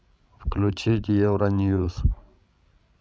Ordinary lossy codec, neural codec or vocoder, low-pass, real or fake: none; codec, 16 kHz, 16 kbps, FreqCodec, larger model; none; fake